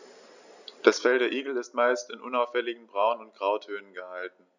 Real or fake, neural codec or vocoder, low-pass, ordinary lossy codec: real; none; 7.2 kHz; none